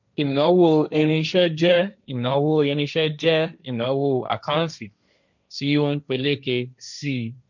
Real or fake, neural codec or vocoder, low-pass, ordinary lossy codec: fake; codec, 16 kHz, 1.1 kbps, Voila-Tokenizer; 7.2 kHz; none